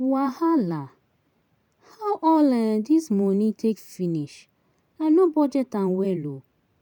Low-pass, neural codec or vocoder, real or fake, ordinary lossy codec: 19.8 kHz; vocoder, 44.1 kHz, 128 mel bands every 512 samples, BigVGAN v2; fake; none